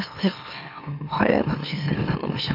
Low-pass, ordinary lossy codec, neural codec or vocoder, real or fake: 5.4 kHz; AAC, 48 kbps; autoencoder, 44.1 kHz, a latent of 192 numbers a frame, MeloTTS; fake